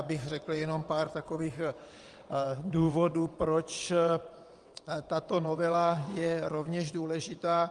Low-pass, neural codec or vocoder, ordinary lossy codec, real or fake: 9.9 kHz; vocoder, 22.05 kHz, 80 mel bands, WaveNeXt; Opus, 32 kbps; fake